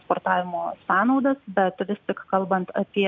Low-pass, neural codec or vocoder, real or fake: 7.2 kHz; none; real